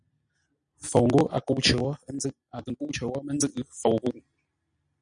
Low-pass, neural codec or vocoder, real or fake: 9.9 kHz; none; real